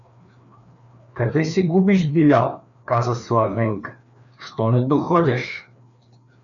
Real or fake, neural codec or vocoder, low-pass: fake; codec, 16 kHz, 2 kbps, FreqCodec, larger model; 7.2 kHz